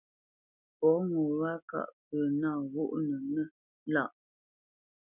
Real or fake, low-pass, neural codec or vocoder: real; 3.6 kHz; none